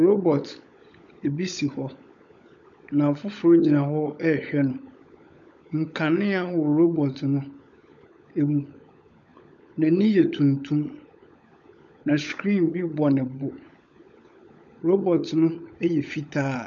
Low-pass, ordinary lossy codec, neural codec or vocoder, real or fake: 7.2 kHz; MP3, 64 kbps; codec, 16 kHz, 16 kbps, FunCodec, trained on LibriTTS, 50 frames a second; fake